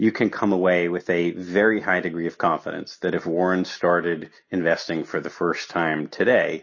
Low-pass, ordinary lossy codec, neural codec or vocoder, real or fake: 7.2 kHz; MP3, 32 kbps; none; real